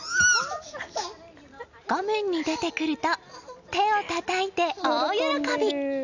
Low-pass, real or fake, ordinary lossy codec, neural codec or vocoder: 7.2 kHz; real; none; none